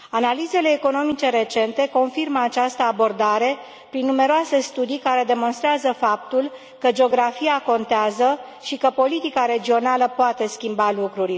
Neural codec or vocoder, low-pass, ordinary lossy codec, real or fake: none; none; none; real